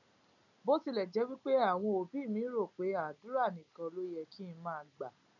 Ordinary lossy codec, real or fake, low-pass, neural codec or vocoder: none; real; 7.2 kHz; none